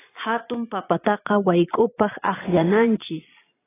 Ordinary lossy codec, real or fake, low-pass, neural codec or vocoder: AAC, 16 kbps; fake; 3.6 kHz; vocoder, 22.05 kHz, 80 mel bands, Vocos